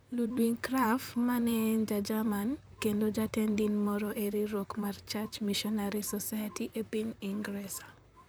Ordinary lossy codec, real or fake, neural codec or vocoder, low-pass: none; fake; vocoder, 44.1 kHz, 128 mel bands, Pupu-Vocoder; none